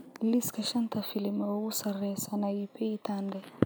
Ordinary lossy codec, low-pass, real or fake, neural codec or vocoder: none; none; real; none